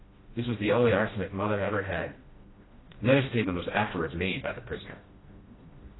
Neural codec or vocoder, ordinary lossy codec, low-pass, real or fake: codec, 16 kHz, 1 kbps, FreqCodec, smaller model; AAC, 16 kbps; 7.2 kHz; fake